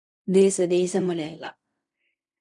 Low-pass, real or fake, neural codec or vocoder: 10.8 kHz; fake; codec, 16 kHz in and 24 kHz out, 0.4 kbps, LongCat-Audio-Codec, fine tuned four codebook decoder